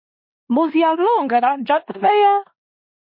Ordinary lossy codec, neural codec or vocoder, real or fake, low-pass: MP3, 32 kbps; codec, 16 kHz in and 24 kHz out, 0.9 kbps, LongCat-Audio-Codec, four codebook decoder; fake; 5.4 kHz